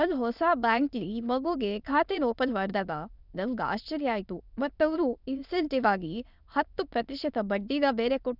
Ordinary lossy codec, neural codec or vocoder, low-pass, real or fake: none; autoencoder, 22.05 kHz, a latent of 192 numbers a frame, VITS, trained on many speakers; 5.4 kHz; fake